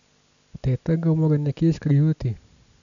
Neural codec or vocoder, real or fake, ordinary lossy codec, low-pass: none; real; none; 7.2 kHz